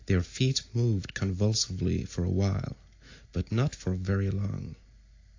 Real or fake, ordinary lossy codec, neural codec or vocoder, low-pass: real; AAC, 48 kbps; none; 7.2 kHz